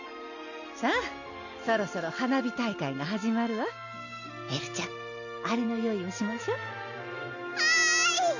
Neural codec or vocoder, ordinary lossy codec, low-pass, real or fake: none; none; 7.2 kHz; real